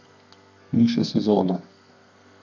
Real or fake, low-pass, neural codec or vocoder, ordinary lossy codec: fake; 7.2 kHz; codec, 32 kHz, 1.9 kbps, SNAC; none